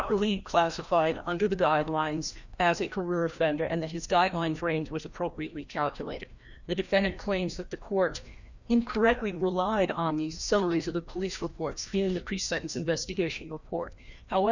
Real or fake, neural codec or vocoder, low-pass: fake; codec, 16 kHz, 1 kbps, FreqCodec, larger model; 7.2 kHz